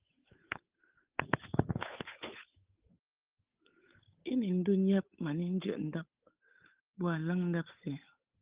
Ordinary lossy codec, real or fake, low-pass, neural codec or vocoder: Opus, 32 kbps; fake; 3.6 kHz; codec, 16 kHz, 8 kbps, FunCodec, trained on Chinese and English, 25 frames a second